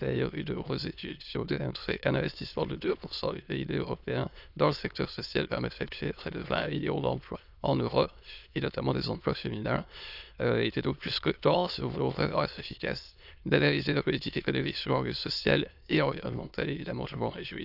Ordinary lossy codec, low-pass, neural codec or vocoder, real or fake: none; 5.4 kHz; autoencoder, 22.05 kHz, a latent of 192 numbers a frame, VITS, trained on many speakers; fake